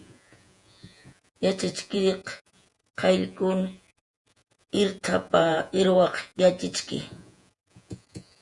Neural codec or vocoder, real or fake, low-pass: vocoder, 48 kHz, 128 mel bands, Vocos; fake; 10.8 kHz